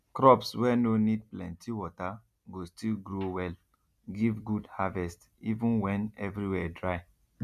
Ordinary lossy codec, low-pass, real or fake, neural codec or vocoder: none; 14.4 kHz; real; none